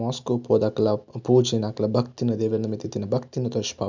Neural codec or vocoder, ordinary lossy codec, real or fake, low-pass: none; none; real; 7.2 kHz